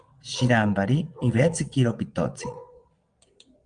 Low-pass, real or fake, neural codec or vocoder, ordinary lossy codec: 9.9 kHz; fake; vocoder, 22.05 kHz, 80 mel bands, WaveNeXt; Opus, 32 kbps